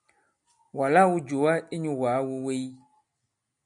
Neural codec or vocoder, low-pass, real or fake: none; 10.8 kHz; real